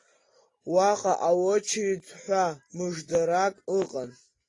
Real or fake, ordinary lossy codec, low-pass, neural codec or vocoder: real; AAC, 32 kbps; 10.8 kHz; none